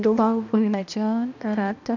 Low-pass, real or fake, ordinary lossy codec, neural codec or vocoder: 7.2 kHz; fake; none; codec, 16 kHz, 1 kbps, X-Codec, HuBERT features, trained on balanced general audio